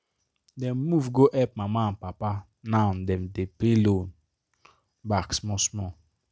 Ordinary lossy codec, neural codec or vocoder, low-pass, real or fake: none; none; none; real